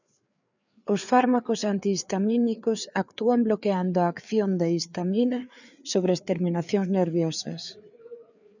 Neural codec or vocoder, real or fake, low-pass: codec, 16 kHz, 4 kbps, FreqCodec, larger model; fake; 7.2 kHz